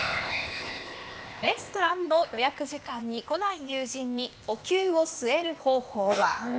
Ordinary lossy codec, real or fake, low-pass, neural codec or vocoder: none; fake; none; codec, 16 kHz, 0.8 kbps, ZipCodec